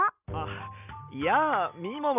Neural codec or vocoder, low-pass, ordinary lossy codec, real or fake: none; 3.6 kHz; none; real